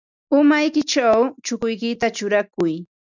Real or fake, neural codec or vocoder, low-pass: real; none; 7.2 kHz